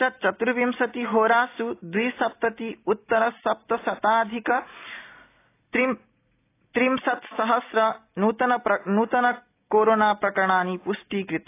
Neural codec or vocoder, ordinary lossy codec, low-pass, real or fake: none; AAC, 24 kbps; 3.6 kHz; real